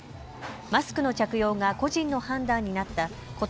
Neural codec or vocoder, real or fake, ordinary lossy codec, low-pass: none; real; none; none